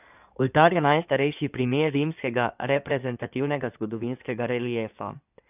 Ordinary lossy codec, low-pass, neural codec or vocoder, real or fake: none; 3.6 kHz; codec, 16 kHz in and 24 kHz out, 2.2 kbps, FireRedTTS-2 codec; fake